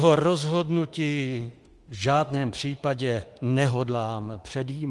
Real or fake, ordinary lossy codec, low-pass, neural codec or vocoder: fake; Opus, 24 kbps; 10.8 kHz; autoencoder, 48 kHz, 32 numbers a frame, DAC-VAE, trained on Japanese speech